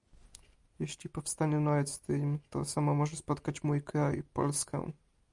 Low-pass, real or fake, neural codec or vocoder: 10.8 kHz; real; none